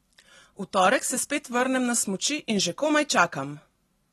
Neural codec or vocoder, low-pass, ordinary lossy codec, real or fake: none; 19.8 kHz; AAC, 32 kbps; real